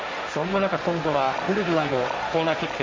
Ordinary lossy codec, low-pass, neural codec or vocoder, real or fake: none; none; codec, 16 kHz, 1.1 kbps, Voila-Tokenizer; fake